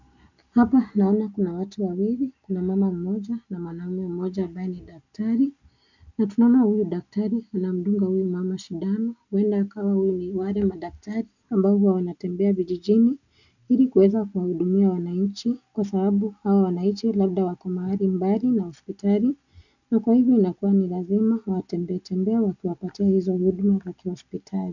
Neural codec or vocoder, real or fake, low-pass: none; real; 7.2 kHz